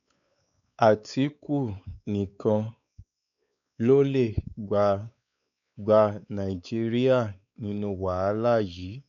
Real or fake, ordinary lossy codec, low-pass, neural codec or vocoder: fake; none; 7.2 kHz; codec, 16 kHz, 4 kbps, X-Codec, WavLM features, trained on Multilingual LibriSpeech